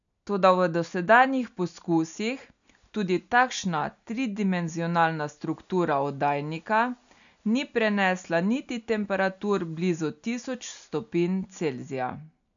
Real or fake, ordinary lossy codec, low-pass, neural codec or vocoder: real; none; 7.2 kHz; none